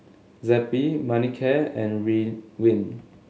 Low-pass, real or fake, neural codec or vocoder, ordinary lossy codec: none; real; none; none